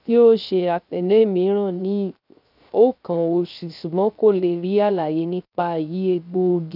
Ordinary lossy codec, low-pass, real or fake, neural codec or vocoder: none; 5.4 kHz; fake; codec, 16 kHz, 0.3 kbps, FocalCodec